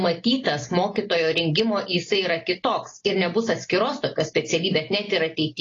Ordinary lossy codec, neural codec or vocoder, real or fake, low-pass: AAC, 32 kbps; none; real; 7.2 kHz